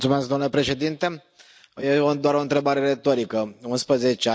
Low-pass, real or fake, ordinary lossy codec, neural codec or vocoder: none; real; none; none